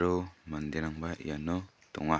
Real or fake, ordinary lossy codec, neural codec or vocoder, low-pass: real; none; none; none